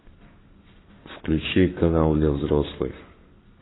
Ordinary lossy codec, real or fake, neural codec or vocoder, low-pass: AAC, 16 kbps; fake; codec, 44.1 kHz, 7.8 kbps, Pupu-Codec; 7.2 kHz